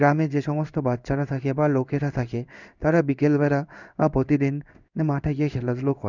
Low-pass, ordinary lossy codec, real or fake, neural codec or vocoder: 7.2 kHz; none; fake; codec, 16 kHz in and 24 kHz out, 1 kbps, XY-Tokenizer